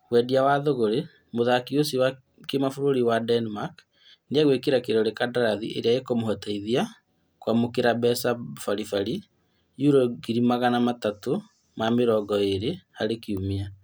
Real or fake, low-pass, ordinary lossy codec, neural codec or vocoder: real; none; none; none